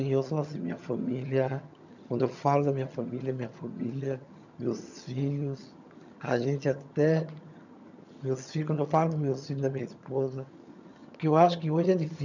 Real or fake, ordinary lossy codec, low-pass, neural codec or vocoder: fake; none; 7.2 kHz; vocoder, 22.05 kHz, 80 mel bands, HiFi-GAN